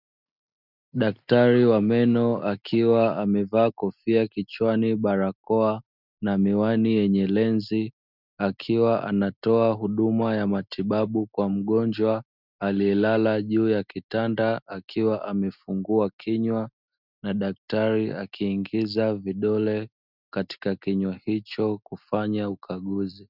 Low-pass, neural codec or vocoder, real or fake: 5.4 kHz; none; real